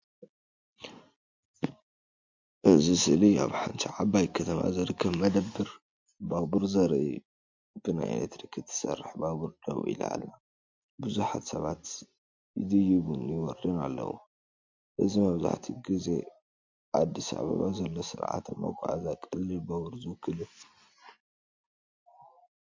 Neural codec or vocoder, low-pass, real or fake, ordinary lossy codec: none; 7.2 kHz; real; MP3, 48 kbps